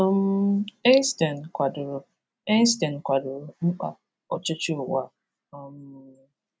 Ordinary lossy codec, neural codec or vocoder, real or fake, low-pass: none; none; real; none